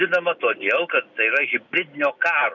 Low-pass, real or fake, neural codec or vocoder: 7.2 kHz; real; none